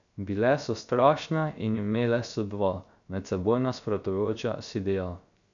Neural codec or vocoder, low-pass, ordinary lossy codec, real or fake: codec, 16 kHz, 0.3 kbps, FocalCodec; 7.2 kHz; none; fake